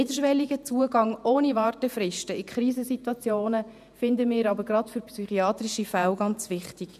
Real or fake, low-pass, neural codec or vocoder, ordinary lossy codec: fake; 14.4 kHz; vocoder, 48 kHz, 128 mel bands, Vocos; none